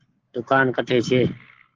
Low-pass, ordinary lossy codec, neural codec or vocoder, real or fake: 7.2 kHz; Opus, 16 kbps; codec, 44.1 kHz, 7.8 kbps, Pupu-Codec; fake